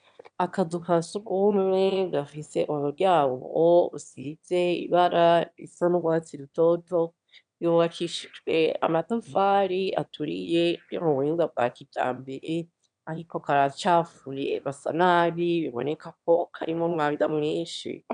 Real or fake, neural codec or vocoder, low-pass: fake; autoencoder, 22.05 kHz, a latent of 192 numbers a frame, VITS, trained on one speaker; 9.9 kHz